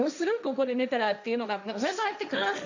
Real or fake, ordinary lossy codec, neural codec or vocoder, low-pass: fake; none; codec, 16 kHz, 1.1 kbps, Voila-Tokenizer; 7.2 kHz